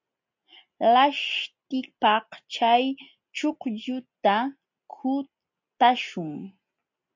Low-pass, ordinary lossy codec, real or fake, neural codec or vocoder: 7.2 kHz; MP3, 48 kbps; real; none